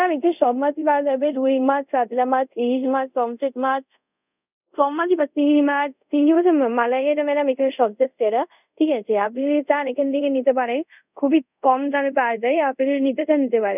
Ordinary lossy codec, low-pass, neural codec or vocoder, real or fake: none; 3.6 kHz; codec, 24 kHz, 0.5 kbps, DualCodec; fake